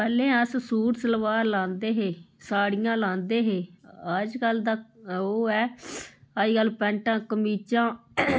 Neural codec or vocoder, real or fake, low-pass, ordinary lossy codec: none; real; none; none